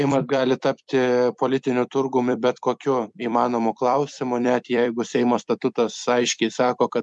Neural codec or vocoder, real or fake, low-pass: vocoder, 44.1 kHz, 128 mel bands every 256 samples, BigVGAN v2; fake; 10.8 kHz